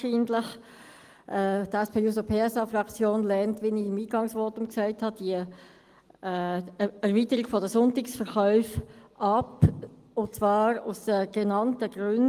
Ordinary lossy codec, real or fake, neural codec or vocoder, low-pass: Opus, 24 kbps; real; none; 14.4 kHz